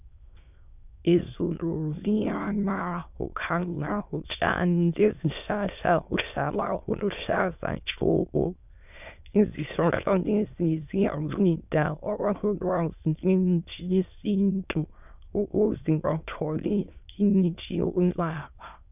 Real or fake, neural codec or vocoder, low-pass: fake; autoencoder, 22.05 kHz, a latent of 192 numbers a frame, VITS, trained on many speakers; 3.6 kHz